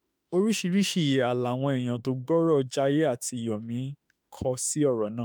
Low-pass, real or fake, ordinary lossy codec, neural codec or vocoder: none; fake; none; autoencoder, 48 kHz, 32 numbers a frame, DAC-VAE, trained on Japanese speech